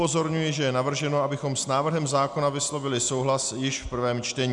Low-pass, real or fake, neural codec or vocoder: 10.8 kHz; real; none